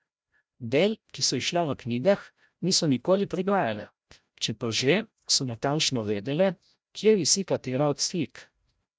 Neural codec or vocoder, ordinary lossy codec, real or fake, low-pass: codec, 16 kHz, 0.5 kbps, FreqCodec, larger model; none; fake; none